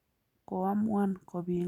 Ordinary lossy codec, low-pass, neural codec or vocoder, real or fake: none; 19.8 kHz; none; real